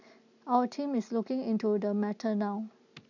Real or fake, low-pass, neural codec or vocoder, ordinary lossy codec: real; 7.2 kHz; none; none